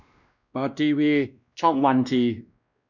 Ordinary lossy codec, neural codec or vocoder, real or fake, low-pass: none; codec, 16 kHz, 1 kbps, X-Codec, WavLM features, trained on Multilingual LibriSpeech; fake; 7.2 kHz